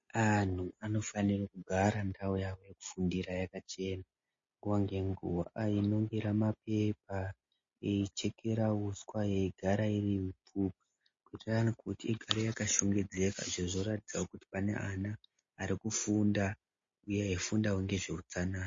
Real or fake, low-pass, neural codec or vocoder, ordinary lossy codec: real; 7.2 kHz; none; MP3, 32 kbps